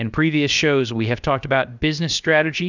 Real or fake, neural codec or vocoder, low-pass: fake; codec, 16 kHz, about 1 kbps, DyCAST, with the encoder's durations; 7.2 kHz